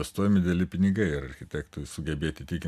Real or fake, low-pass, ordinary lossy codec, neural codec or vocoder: real; 14.4 kHz; MP3, 96 kbps; none